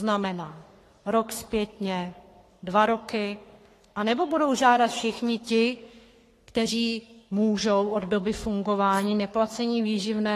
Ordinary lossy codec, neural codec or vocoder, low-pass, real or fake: AAC, 48 kbps; codec, 44.1 kHz, 3.4 kbps, Pupu-Codec; 14.4 kHz; fake